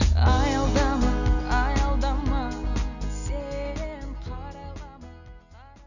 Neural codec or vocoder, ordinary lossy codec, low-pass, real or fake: none; none; 7.2 kHz; real